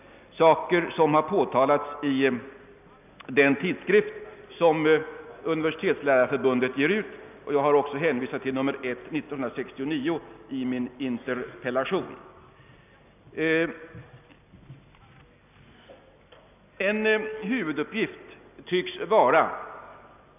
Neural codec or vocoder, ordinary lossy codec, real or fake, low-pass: none; none; real; 3.6 kHz